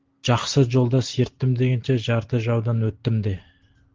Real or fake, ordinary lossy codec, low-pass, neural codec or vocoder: real; Opus, 16 kbps; 7.2 kHz; none